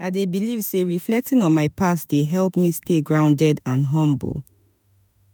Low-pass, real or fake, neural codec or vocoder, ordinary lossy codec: none; fake; autoencoder, 48 kHz, 32 numbers a frame, DAC-VAE, trained on Japanese speech; none